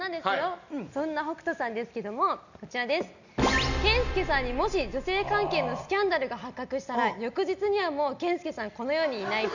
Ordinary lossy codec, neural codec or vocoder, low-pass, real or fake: none; none; 7.2 kHz; real